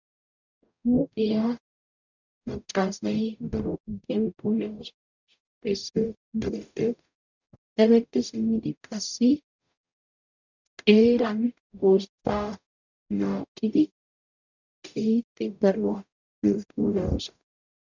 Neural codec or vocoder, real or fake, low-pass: codec, 44.1 kHz, 0.9 kbps, DAC; fake; 7.2 kHz